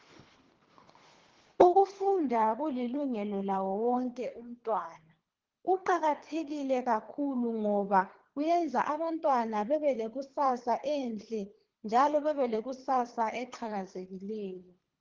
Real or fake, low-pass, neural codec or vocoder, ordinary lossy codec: fake; 7.2 kHz; codec, 24 kHz, 3 kbps, HILCodec; Opus, 16 kbps